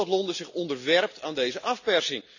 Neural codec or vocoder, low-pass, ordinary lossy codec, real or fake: none; 7.2 kHz; none; real